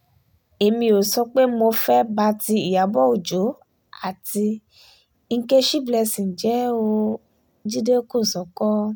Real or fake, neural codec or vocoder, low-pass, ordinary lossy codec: real; none; none; none